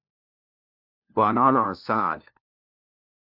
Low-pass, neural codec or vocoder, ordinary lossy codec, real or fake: 5.4 kHz; codec, 16 kHz, 1 kbps, FunCodec, trained on LibriTTS, 50 frames a second; AAC, 48 kbps; fake